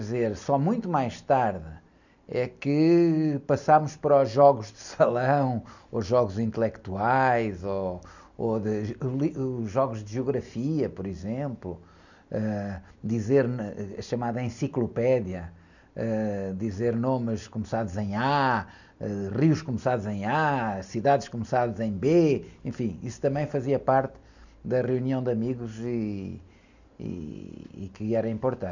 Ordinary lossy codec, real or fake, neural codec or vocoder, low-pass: none; real; none; 7.2 kHz